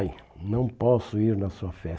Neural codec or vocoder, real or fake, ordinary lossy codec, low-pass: none; real; none; none